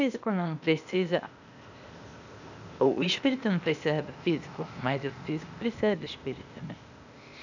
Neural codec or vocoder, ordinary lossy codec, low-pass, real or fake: codec, 16 kHz, 0.8 kbps, ZipCodec; none; 7.2 kHz; fake